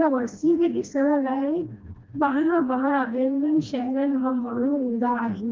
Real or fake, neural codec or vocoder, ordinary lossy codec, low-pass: fake; codec, 16 kHz, 1 kbps, FreqCodec, smaller model; Opus, 32 kbps; 7.2 kHz